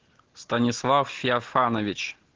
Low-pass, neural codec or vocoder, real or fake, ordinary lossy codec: 7.2 kHz; none; real; Opus, 16 kbps